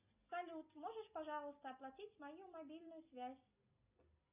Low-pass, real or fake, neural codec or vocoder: 3.6 kHz; real; none